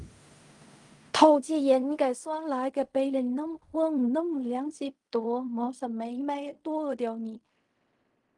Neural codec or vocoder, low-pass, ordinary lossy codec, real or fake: codec, 16 kHz in and 24 kHz out, 0.4 kbps, LongCat-Audio-Codec, fine tuned four codebook decoder; 10.8 kHz; Opus, 32 kbps; fake